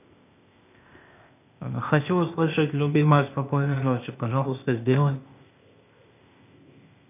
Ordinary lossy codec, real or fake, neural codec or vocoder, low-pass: none; fake; codec, 16 kHz, 0.8 kbps, ZipCodec; 3.6 kHz